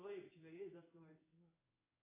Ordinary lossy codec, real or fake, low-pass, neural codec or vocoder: AAC, 24 kbps; fake; 3.6 kHz; codec, 16 kHz in and 24 kHz out, 1 kbps, XY-Tokenizer